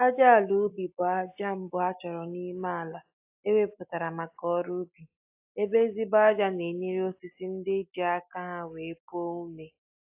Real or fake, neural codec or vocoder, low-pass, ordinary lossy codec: real; none; 3.6 kHz; AAC, 32 kbps